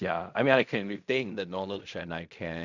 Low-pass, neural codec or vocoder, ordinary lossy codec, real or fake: 7.2 kHz; codec, 16 kHz in and 24 kHz out, 0.4 kbps, LongCat-Audio-Codec, fine tuned four codebook decoder; none; fake